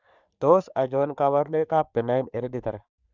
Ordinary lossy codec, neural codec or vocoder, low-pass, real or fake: none; codec, 44.1 kHz, 3.4 kbps, Pupu-Codec; 7.2 kHz; fake